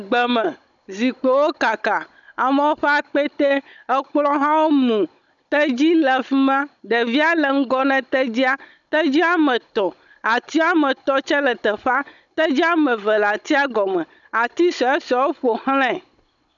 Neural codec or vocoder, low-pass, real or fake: codec, 16 kHz, 16 kbps, FunCodec, trained on Chinese and English, 50 frames a second; 7.2 kHz; fake